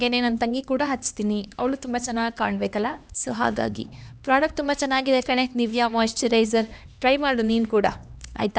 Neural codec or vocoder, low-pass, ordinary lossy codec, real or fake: codec, 16 kHz, 2 kbps, X-Codec, HuBERT features, trained on LibriSpeech; none; none; fake